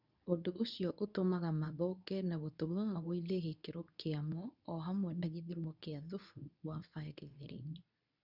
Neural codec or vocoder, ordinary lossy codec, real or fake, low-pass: codec, 24 kHz, 0.9 kbps, WavTokenizer, medium speech release version 2; none; fake; 5.4 kHz